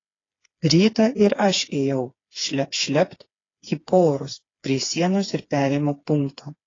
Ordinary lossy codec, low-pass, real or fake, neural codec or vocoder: AAC, 32 kbps; 7.2 kHz; fake; codec, 16 kHz, 4 kbps, FreqCodec, smaller model